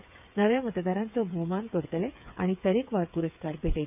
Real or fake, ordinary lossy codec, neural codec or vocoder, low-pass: fake; none; codec, 16 kHz, 8 kbps, FreqCodec, smaller model; 3.6 kHz